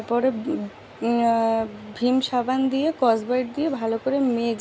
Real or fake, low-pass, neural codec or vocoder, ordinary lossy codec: real; none; none; none